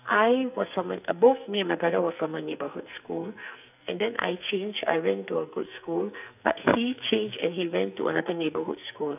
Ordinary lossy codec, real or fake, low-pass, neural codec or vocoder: none; fake; 3.6 kHz; codec, 44.1 kHz, 2.6 kbps, SNAC